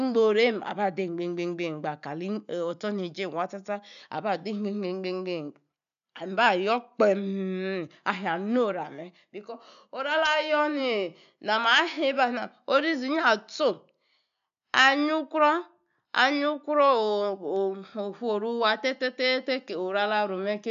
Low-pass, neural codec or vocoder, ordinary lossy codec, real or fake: 7.2 kHz; none; none; real